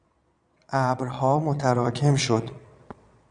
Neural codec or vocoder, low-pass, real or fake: vocoder, 22.05 kHz, 80 mel bands, Vocos; 9.9 kHz; fake